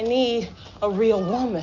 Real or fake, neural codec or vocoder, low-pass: real; none; 7.2 kHz